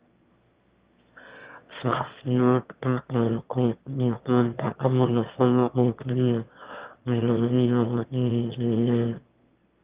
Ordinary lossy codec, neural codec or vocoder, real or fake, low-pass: Opus, 32 kbps; autoencoder, 22.05 kHz, a latent of 192 numbers a frame, VITS, trained on one speaker; fake; 3.6 kHz